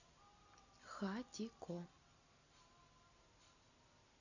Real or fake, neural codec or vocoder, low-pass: real; none; 7.2 kHz